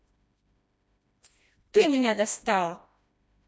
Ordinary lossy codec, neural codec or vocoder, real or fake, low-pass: none; codec, 16 kHz, 1 kbps, FreqCodec, smaller model; fake; none